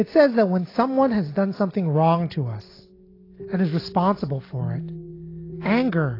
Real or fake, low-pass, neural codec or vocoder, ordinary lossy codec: real; 5.4 kHz; none; AAC, 24 kbps